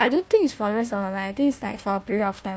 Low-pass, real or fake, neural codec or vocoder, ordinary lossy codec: none; fake; codec, 16 kHz, 1 kbps, FunCodec, trained on Chinese and English, 50 frames a second; none